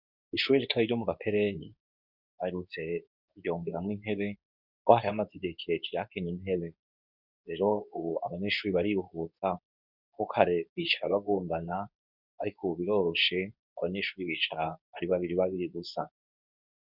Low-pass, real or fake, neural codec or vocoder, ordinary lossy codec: 5.4 kHz; fake; codec, 24 kHz, 0.9 kbps, WavTokenizer, medium speech release version 2; Opus, 64 kbps